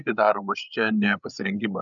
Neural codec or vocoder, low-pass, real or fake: codec, 16 kHz, 16 kbps, FreqCodec, larger model; 7.2 kHz; fake